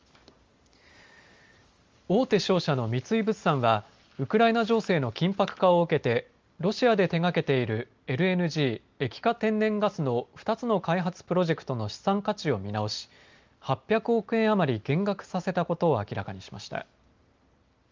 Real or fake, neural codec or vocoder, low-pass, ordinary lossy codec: real; none; 7.2 kHz; Opus, 32 kbps